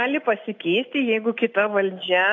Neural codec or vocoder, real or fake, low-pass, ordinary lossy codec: none; real; 7.2 kHz; AAC, 48 kbps